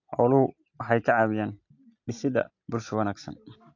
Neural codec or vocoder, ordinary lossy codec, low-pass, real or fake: none; AAC, 48 kbps; 7.2 kHz; real